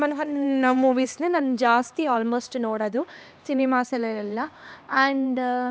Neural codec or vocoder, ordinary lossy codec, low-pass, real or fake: codec, 16 kHz, 2 kbps, X-Codec, HuBERT features, trained on LibriSpeech; none; none; fake